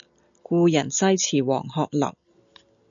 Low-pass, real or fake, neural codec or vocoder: 7.2 kHz; real; none